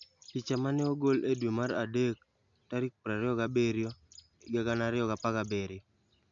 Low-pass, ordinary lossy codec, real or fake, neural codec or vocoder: 7.2 kHz; none; real; none